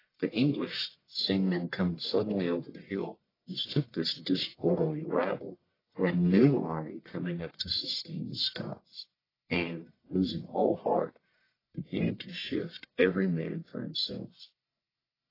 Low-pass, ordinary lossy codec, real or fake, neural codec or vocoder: 5.4 kHz; AAC, 24 kbps; fake; codec, 44.1 kHz, 1.7 kbps, Pupu-Codec